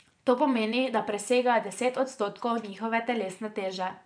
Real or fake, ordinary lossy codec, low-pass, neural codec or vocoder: fake; none; 9.9 kHz; vocoder, 24 kHz, 100 mel bands, Vocos